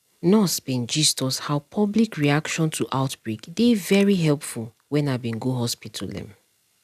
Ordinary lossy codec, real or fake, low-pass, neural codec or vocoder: none; real; 14.4 kHz; none